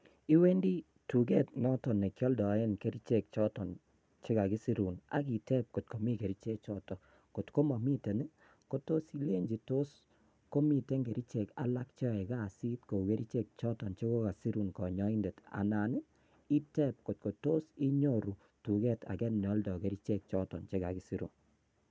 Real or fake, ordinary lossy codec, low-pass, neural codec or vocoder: real; none; none; none